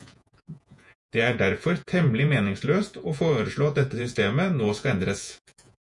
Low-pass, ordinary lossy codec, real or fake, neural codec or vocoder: 10.8 kHz; MP3, 96 kbps; fake; vocoder, 48 kHz, 128 mel bands, Vocos